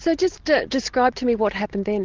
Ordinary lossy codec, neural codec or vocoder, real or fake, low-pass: Opus, 16 kbps; none; real; 7.2 kHz